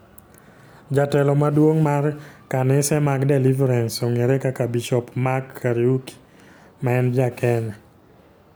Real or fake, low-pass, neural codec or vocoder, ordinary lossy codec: real; none; none; none